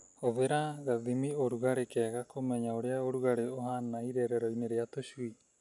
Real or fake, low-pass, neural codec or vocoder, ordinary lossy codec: real; 10.8 kHz; none; none